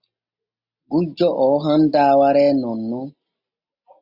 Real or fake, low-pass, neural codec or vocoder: real; 5.4 kHz; none